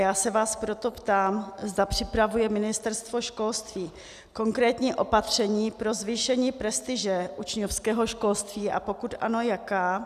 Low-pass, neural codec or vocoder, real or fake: 14.4 kHz; none; real